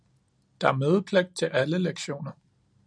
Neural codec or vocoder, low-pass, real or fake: none; 9.9 kHz; real